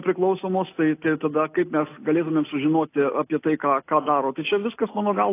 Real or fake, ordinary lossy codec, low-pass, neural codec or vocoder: real; AAC, 24 kbps; 3.6 kHz; none